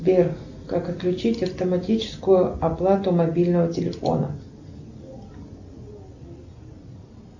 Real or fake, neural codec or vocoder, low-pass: real; none; 7.2 kHz